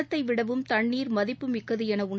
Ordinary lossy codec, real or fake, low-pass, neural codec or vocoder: none; real; none; none